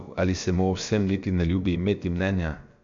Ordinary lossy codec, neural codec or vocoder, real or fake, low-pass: MP3, 64 kbps; codec, 16 kHz, about 1 kbps, DyCAST, with the encoder's durations; fake; 7.2 kHz